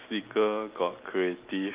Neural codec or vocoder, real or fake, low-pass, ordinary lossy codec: none; real; 3.6 kHz; Opus, 24 kbps